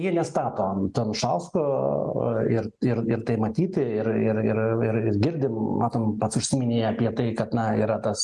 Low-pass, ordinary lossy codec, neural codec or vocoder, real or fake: 10.8 kHz; Opus, 32 kbps; none; real